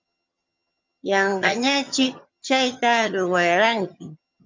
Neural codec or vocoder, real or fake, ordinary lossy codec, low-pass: vocoder, 22.05 kHz, 80 mel bands, HiFi-GAN; fake; MP3, 64 kbps; 7.2 kHz